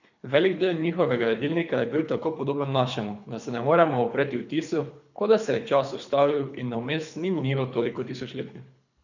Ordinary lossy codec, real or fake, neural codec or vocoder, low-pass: none; fake; codec, 24 kHz, 3 kbps, HILCodec; 7.2 kHz